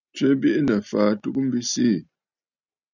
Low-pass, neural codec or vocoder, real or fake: 7.2 kHz; none; real